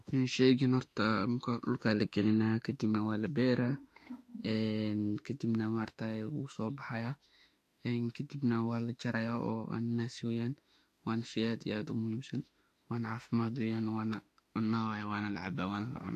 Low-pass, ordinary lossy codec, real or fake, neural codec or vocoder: 14.4 kHz; AAC, 64 kbps; fake; autoencoder, 48 kHz, 32 numbers a frame, DAC-VAE, trained on Japanese speech